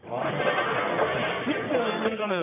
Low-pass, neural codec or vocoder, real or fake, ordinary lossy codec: 3.6 kHz; codec, 44.1 kHz, 1.7 kbps, Pupu-Codec; fake; none